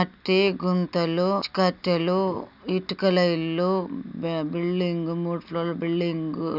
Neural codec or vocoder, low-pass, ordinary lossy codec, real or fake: none; 5.4 kHz; none; real